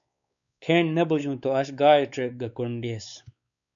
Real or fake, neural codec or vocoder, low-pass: fake; codec, 16 kHz, 4 kbps, X-Codec, WavLM features, trained on Multilingual LibriSpeech; 7.2 kHz